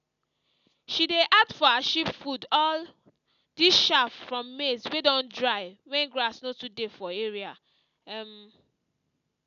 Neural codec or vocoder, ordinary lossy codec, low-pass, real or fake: none; none; 7.2 kHz; real